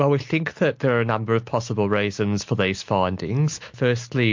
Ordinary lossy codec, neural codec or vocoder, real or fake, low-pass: MP3, 48 kbps; none; real; 7.2 kHz